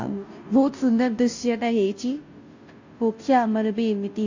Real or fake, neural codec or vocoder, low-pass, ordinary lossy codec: fake; codec, 16 kHz, 0.5 kbps, FunCodec, trained on Chinese and English, 25 frames a second; 7.2 kHz; AAC, 48 kbps